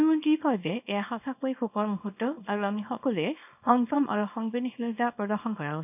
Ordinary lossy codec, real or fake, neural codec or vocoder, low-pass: none; fake; codec, 24 kHz, 0.9 kbps, WavTokenizer, small release; 3.6 kHz